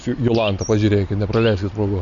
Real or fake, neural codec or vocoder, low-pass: real; none; 7.2 kHz